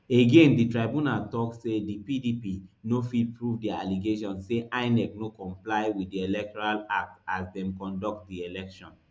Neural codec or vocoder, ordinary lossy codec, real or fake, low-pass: none; none; real; none